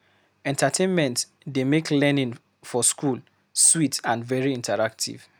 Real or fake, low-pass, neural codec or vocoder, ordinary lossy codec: real; none; none; none